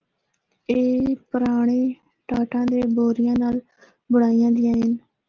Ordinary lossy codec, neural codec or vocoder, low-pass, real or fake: Opus, 24 kbps; none; 7.2 kHz; real